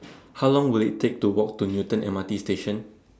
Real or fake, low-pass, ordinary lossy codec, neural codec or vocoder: real; none; none; none